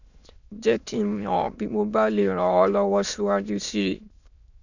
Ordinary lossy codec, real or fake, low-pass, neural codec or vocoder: none; fake; 7.2 kHz; autoencoder, 22.05 kHz, a latent of 192 numbers a frame, VITS, trained on many speakers